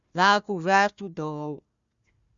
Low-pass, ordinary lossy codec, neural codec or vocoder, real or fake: 7.2 kHz; Opus, 64 kbps; codec, 16 kHz, 1 kbps, FunCodec, trained on Chinese and English, 50 frames a second; fake